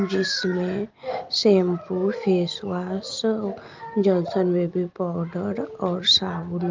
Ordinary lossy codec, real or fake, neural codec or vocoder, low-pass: Opus, 24 kbps; real; none; 7.2 kHz